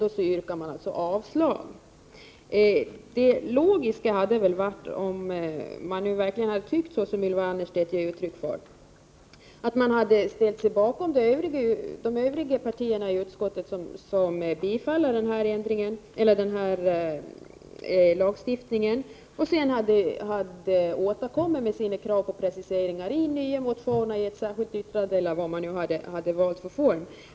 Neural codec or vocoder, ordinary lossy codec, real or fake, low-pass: none; none; real; none